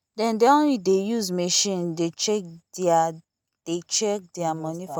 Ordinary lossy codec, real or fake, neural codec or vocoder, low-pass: none; real; none; none